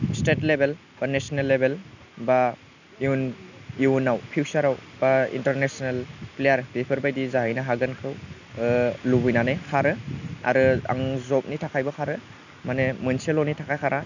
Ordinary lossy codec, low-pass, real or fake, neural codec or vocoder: none; 7.2 kHz; real; none